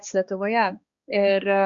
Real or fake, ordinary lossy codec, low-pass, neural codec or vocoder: fake; Opus, 64 kbps; 7.2 kHz; codec, 16 kHz, 2 kbps, X-Codec, HuBERT features, trained on balanced general audio